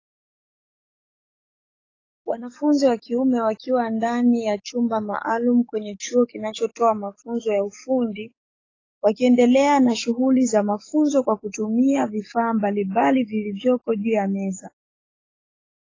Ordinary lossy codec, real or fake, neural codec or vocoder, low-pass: AAC, 32 kbps; fake; codec, 44.1 kHz, 7.8 kbps, DAC; 7.2 kHz